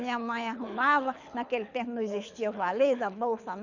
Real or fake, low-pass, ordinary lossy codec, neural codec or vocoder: fake; 7.2 kHz; none; codec, 24 kHz, 6 kbps, HILCodec